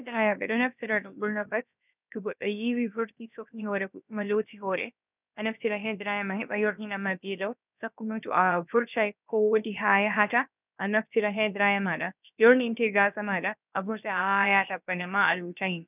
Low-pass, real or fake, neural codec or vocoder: 3.6 kHz; fake; codec, 16 kHz, about 1 kbps, DyCAST, with the encoder's durations